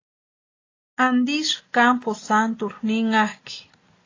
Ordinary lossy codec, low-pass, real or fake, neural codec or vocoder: AAC, 32 kbps; 7.2 kHz; real; none